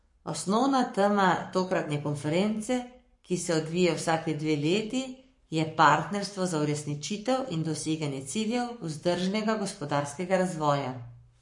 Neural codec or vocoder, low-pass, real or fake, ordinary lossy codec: codec, 44.1 kHz, 7.8 kbps, Pupu-Codec; 10.8 kHz; fake; MP3, 48 kbps